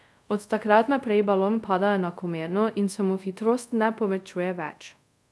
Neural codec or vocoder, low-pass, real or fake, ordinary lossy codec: codec, 24 kHz, 0.5 kbps, DualCodec; none; fake; none